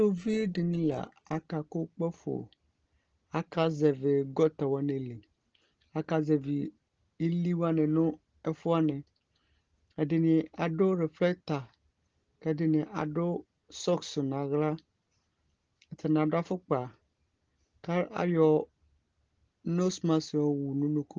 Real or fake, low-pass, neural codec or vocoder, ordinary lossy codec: real; 7.2 kHz; none; Opus, 16 kbps